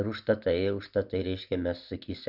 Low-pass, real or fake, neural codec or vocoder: 5.4 kHz; real; none